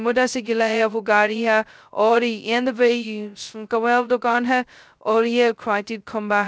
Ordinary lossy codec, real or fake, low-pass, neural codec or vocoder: none; fake; none; codec, 16 kHz, 0.2 kbps, FocalCodec